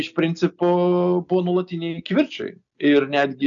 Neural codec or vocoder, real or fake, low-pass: none; real; 7.2 kHz